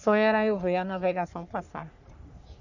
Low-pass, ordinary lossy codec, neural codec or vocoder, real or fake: 7.2 kHz; none; codec, 44.1 kHz, 3.4 kbps, Pupu-Codec; fake